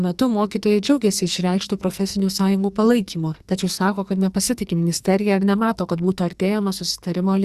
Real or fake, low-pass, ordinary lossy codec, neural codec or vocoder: fake; 14.4 kHz; Opus, 64 kbps; codec, 44.1 kHz, 2.6 kbps, SNAC